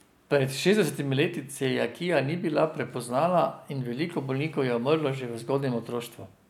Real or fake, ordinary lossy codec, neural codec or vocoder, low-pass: real; none; none; 19.8 kHz